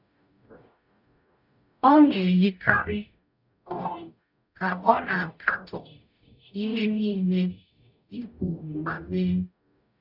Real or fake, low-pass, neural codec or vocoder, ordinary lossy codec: fake; 5.4 kHz; codec, 44.1 kHz, 0.9 kbps, DAC; none